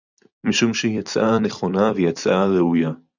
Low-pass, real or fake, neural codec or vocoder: 7.2 kHz; fake; vocoder, 44.1 kHz, 128 mel bands every 256 samples, BigVGAN v2